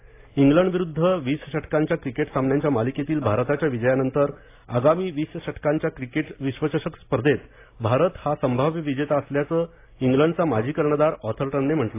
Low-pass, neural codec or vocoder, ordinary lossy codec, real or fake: 3.6 kHz; none; AAC, 24 kbps; real